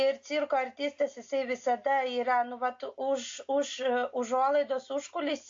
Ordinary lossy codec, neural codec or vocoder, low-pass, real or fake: AAC, 48 kbps; none; 7.2 kHz; real